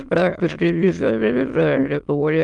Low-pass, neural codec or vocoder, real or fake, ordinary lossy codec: 9.9 kHz; autoencoder, 22.05 kHz, a latent of 192 numbers a frame, VITS, trained on many speakers; fake; Opus, 32 kbps